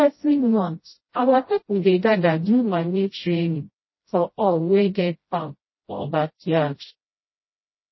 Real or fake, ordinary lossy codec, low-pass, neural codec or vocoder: fake; MP3, 24 kbps; 7.2 kHz; codec, 16 kHz, 0.5 kbps, FreqCodec, smaller model